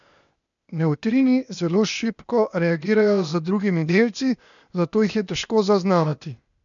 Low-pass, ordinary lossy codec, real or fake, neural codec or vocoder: 7.2 kHz; none; fake; codec, 16 kHz, 0.8 kbps, ZipCodec